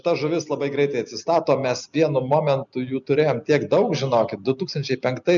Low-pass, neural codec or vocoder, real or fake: 7.2 kHz; none; real